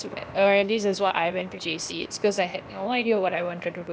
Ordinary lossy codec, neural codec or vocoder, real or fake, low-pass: none; codec, 16 kHz, 0.8 kbps, ZipCodec; fake; none